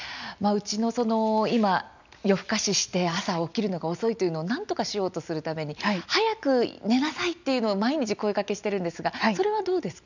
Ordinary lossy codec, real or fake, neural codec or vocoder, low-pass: none; real; none; 7.2 kHz